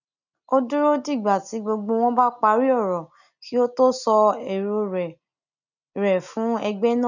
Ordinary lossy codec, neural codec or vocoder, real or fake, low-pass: none; none; real; 7.2 kHz